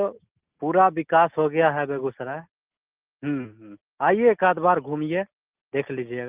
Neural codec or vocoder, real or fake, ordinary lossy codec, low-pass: none; real; Opus, 24 kbps; 3.6 kHz